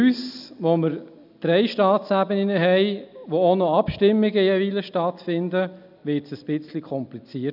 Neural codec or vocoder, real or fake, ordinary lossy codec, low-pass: none; real; none; 5.4 kHz